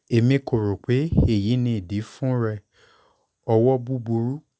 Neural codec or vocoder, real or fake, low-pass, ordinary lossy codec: none; real; none; none